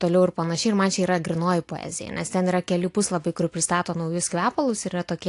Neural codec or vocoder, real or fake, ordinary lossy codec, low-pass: none; real; AAC, 48 kbps; 10.8 kHz